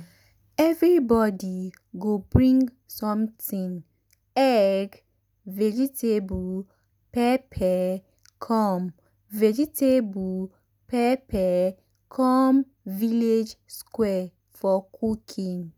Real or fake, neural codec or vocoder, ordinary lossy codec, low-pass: real; none; none; none